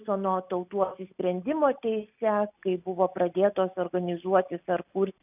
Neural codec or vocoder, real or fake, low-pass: none; real; 3.6 kHz